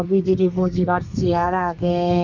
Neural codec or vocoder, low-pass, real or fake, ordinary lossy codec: codec, 32 kHz, 1.9 kbps, SNAC; 7.2 kHz; fake; none